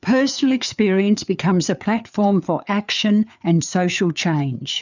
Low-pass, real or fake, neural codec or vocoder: 7.2 kHz; fake; codec, 16 kHz, 4 kbps, FreqCodec, larger model